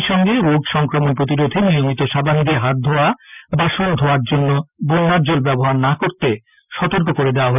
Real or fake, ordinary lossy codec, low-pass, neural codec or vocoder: real; none; 3.6 kHz; none